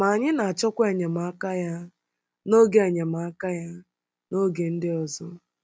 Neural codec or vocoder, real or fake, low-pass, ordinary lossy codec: none; real; none; none